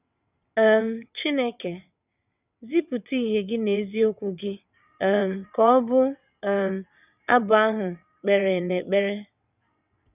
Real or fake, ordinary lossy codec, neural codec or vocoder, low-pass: fake; none; vocoder, 22.05 kHz, 80 mel bands, WaveNeXt; 3.6 kHz